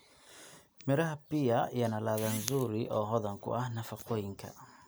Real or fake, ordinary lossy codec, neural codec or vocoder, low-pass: real; none; none; none